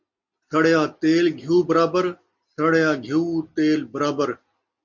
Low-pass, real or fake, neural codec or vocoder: 7.2 kHz; real; none